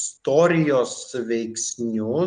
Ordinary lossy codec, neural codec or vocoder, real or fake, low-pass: Opus, 32 kbps; none; real; 9.9 kHz